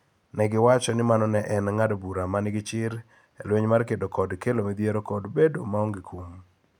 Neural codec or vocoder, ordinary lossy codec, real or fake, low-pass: none; none; real; 19.8 kHz